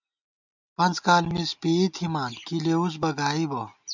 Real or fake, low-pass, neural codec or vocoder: real; 7.2 kHz; none